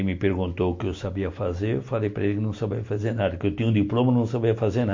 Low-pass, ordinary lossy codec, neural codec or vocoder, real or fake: 7.2 kHz; MP3, 48 kbps; none; real